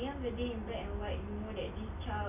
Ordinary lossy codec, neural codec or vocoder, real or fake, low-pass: none; none; real; 3.6 kHz